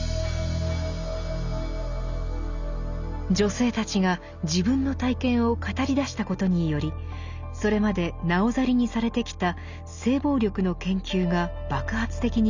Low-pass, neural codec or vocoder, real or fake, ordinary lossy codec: 7.2 kHz; none; real; Opus, 64 kbps